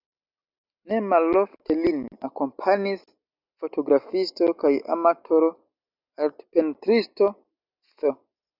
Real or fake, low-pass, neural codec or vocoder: real; 5.4 kHz; none